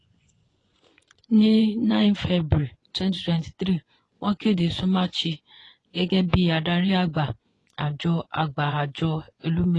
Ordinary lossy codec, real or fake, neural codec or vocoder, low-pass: AAC, 32 kbps; fake; vocoder, 24 kHz, 100 mel bands, Vocos; 10.8 kHz